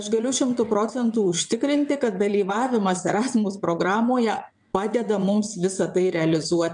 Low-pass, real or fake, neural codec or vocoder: 9.9 kHz; fake; vocoder, 22.05 kHz, 80 mel bands, WaveNeXt